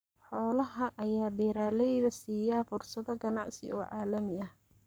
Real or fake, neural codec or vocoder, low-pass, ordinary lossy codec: fake; codec, 44.1 kHz, 7.8 kbps, Pupu-Codec; none; none